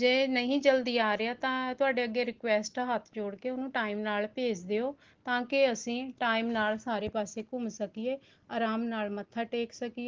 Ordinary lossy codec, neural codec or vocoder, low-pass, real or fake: Opus, 16 kbps; none; 7.2 kHz; real